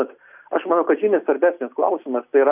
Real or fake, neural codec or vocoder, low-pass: real; none; 3.6 kHz